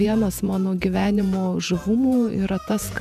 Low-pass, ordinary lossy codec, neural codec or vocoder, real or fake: 14.4 kHz; MP3, 96 kbps; vocoder, 48 kHz, 128 mel bands, Vocos; fake